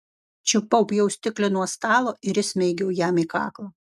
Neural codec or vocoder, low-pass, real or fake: none; 14.4 kHz; real